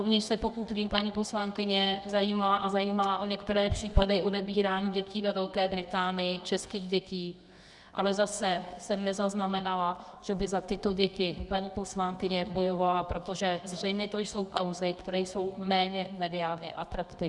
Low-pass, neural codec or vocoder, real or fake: 10.8 kHz; codec, 24 kHz, 0.9 kbps, WavTokenizer, medium music audio release; fake